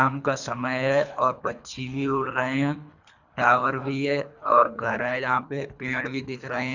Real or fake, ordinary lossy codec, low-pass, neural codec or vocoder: fake; none; 7.2 kHz; codec, 24 kHz, 3 kbps, HILCodec